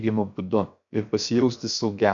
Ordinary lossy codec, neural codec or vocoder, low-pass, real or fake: AAC, 64 kbps; codec, 16 kHz, 0.7 kbps, FocalCodec; 7.2 kHz; fake